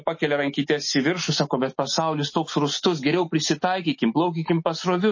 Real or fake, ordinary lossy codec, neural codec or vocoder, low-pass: real; MP3, 32 kbps; none; 7.2 kHz